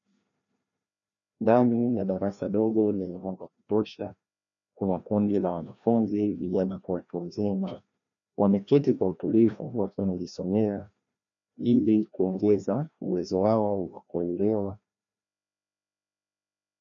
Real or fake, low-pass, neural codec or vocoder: fake; 7.2 kHz; codec, 16 kHz, 1 kbps, FreqCodec, larger model